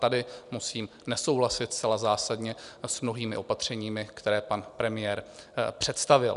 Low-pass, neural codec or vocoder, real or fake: 10.8 kHz; none; real